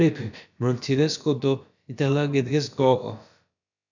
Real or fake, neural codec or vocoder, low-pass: fake; codec, 16 kHz, about 1 kbps, DyCAST, with the encoder's durations; 7.2 kHz